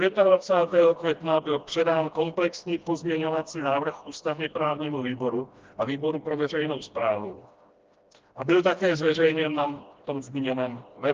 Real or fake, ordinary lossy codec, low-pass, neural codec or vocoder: fake; Opus, 24 kbps; 7.2 kHz; codec, 16 kHz, 1 kbps, FreqCodec, smaller model